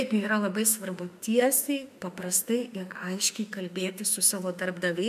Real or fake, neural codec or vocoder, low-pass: fake; autoencoder, 48 kHz, 32 numbers a frame, DAC-VAE, trained on Japanese speech; 14.4 kHz